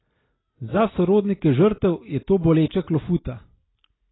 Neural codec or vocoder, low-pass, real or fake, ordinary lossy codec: none; 7.2 kHz; real; AAC, 16 kbps